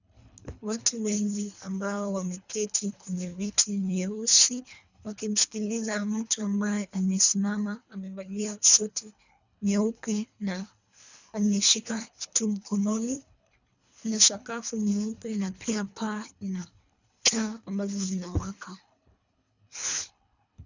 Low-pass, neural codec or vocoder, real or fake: 7.2 kHz; codec, 24 kHz, 3 kbps, HILCodec; fake